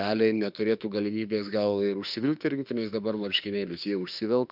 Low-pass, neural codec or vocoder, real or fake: 5.4 kHz; autoencoder, 48 kHz, 32 numbers a frame, DAC-VAE, trained on Japanese speech; fake